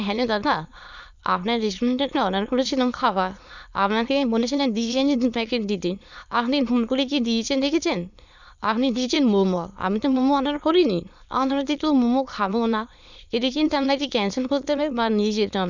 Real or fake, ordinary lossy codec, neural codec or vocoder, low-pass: fake; none; autoencoder, 22.05 kHz, a latent of 192 numbers a frame, VITS, trained on many speakers; 7.2 kHz